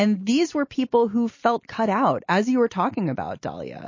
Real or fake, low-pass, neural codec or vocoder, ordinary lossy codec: real; 7.2 kHz; none; MP3, 32 kbps